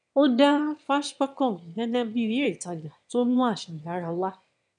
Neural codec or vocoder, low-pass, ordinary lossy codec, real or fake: autoencoder, 22.05 kHz, a latent of 192 numbers a frame, VITS, trained on one speaker; 9.9 kHz; none; fake